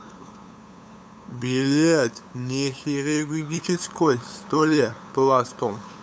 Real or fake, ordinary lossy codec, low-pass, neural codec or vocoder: fake; none; none; codec, 16 kHz, 8 kbps, FunCodec, trained on LibriTTS, 25 frames a second